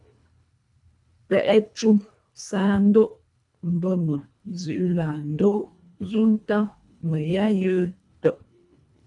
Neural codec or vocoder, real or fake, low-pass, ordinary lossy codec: codec, 24 kHz, 1.5 kbps, HILCodec; fake; 10.8 kHz; AAC, 64 kbps